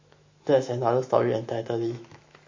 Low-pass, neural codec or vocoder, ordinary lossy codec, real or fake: 7.2 kHz; autoencoder, 48 kHz, 128 numbers a frame, DAC-VAE, trained on Japanese speech; MP3, 32 kbps; fake